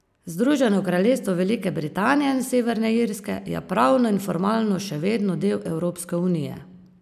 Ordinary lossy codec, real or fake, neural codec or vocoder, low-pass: none; real; none; 14.4 kHz